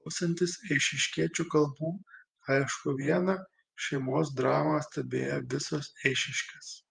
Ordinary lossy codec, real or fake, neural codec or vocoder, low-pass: Opus, 32 kbps; fake; vocoder, 48 kHz, 128 mel bands, Vocos; 9.9 kHz